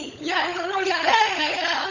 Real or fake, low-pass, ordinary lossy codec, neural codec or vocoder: fake; 7.2 kHz; none; codec, 16 kHz, 16 kbps, FunCodec, trained on LibriTTS, 50 frames a second